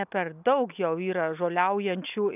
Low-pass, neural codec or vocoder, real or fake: 3.6 kHz; none; real